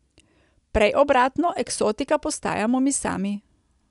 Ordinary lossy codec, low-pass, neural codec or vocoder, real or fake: none; 10.8 kHz; none; real